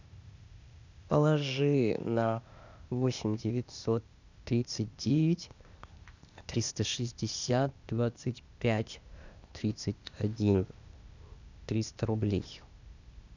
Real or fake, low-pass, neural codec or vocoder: fake; 7.2 kHz; codec, 16 kHz, 0.8 kbps, ZipCodec